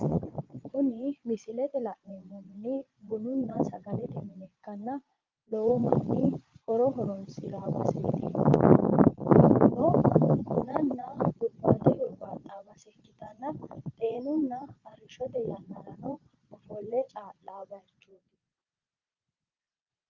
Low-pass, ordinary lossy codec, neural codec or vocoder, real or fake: 7.2 kHz; Opus, 24 kbps; vocoder, 22.05 kHz, 80 mel bands, Vocos; fake